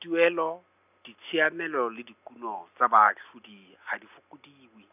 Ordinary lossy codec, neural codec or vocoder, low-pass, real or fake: none; none; 3.6 kHz; real